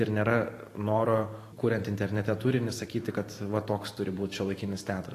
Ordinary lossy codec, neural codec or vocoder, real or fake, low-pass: AAC, 48 kbps; vocoder, 44.1 kHz, 128 mel bands every 512 samples, BigVGAN v2; fake; 14.4 kHz